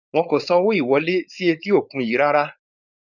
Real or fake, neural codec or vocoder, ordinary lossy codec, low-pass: fake; codec, 16 kHz, 4.8 kbps, FACodec; none; 7.2 kHz